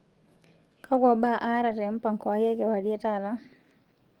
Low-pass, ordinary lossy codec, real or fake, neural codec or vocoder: 19.8 kHz; Opus, 32 kbps; fake; codec, 44.1 kHz, 7.8 kbps, DAC